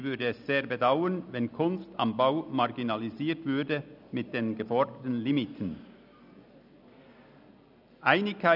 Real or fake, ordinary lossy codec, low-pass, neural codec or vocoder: real; none; 5.4 kHz; none